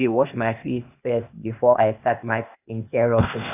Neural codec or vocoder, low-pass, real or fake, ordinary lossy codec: codec, 16 kHz, 0.8 kbps, ZipCodec; 3.6 kHz; fake; none